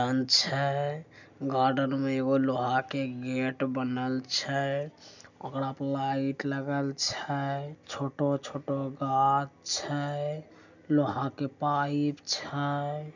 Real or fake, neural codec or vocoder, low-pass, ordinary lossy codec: real; none; 7.2 kHz; none